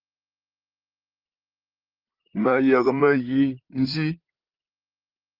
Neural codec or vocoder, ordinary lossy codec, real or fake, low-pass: vocoder, 44.1 kHz, 128 mel bands, Pupu-Vocoder; Opus, 32 kbps; fake; 5.4 kHz